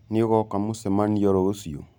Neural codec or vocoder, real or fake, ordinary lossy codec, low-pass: none; real; none; 19.8 kHz